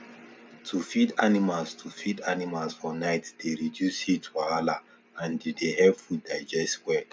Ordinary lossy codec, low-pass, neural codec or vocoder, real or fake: none; none; none; real